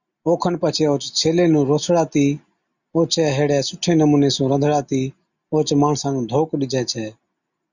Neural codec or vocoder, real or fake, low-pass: none; real; 7.2 kHz